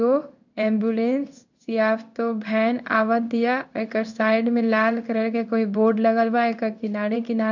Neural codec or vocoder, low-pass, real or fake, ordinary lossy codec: codec, 16 kHz in and 24 kHz out, 1 kbps, XY-Tokenizer; 7.2 kHz; fake; none